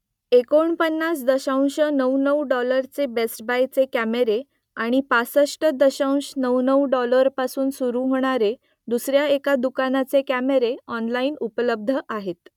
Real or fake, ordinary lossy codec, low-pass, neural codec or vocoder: real; none; 19.8 kHz; none